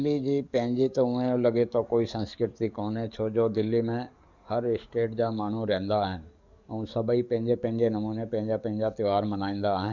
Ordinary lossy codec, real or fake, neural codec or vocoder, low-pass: none; fake; codec, 44.1 kHz, 7.8 kbps, DAC; 7.2 kHz